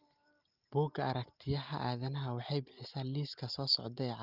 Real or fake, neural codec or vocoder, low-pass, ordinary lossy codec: real; none; 5.4 kHz; Opus, 32 kbps